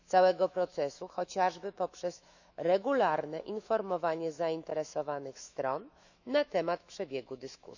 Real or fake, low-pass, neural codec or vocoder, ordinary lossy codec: fake; 7.2 kHz; autoencoder, 48 kHz, 128 numbers a frame, DAC-VAE, trained on Japanese speech; none